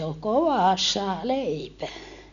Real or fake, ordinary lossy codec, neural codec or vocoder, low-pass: real; none; none; 7.2 kHz